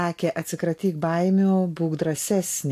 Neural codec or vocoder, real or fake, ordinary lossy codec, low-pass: none; real; AAC, 64 kbps; 14.4 kHz